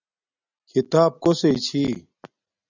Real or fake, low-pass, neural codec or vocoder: real; 7.2 kHz; none